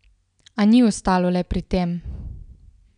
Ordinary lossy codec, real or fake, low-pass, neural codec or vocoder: none; real; 9.9 kHz; none